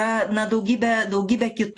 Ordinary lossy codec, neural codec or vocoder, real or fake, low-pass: AAC, 48 kbps; none; real; 10.8 kHz